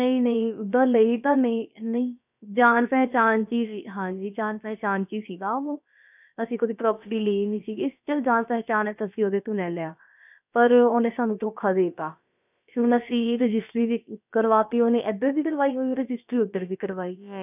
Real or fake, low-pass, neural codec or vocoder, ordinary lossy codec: fake; 3.6 kHz; codec, 16 kHz, about 1 kbps, DyCAST, with the encoder's durations; none